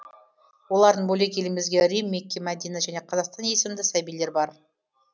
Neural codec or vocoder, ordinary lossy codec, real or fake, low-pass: none; none; real; none